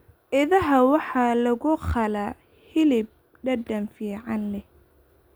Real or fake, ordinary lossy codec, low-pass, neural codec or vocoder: real; none; none; none